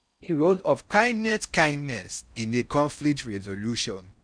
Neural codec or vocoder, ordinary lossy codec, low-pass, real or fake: codec, 16 kHz in and 24 kHz out, 0.6 kbps, FocalCodec, streaming, 4096 codes; none; 9.9 kHz; fake